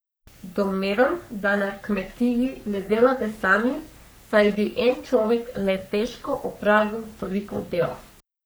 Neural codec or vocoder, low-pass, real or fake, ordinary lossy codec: codec, 44.1 kHz, 3.4 kbps, Pupu-Codec; none; fake; none